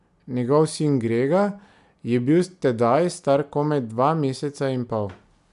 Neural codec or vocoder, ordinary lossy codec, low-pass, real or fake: none; MP3, 96 kbps; 10.8 kHz; real